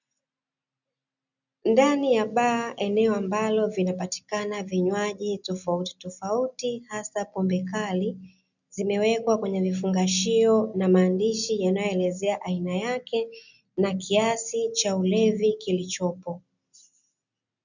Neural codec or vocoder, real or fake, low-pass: none; real; 7.2 kHz